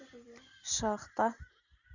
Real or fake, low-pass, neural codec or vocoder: real; 7.2 kHz; none